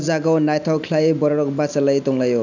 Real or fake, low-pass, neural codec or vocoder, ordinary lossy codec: real; 7.2 kHz; none; none